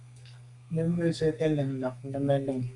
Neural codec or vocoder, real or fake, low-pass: codec, 32 kHz, 1.9 kbps, SNAC; fake; 10.8 kHz